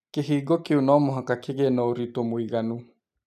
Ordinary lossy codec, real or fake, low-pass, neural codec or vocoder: none; real; 14.4 kHz; none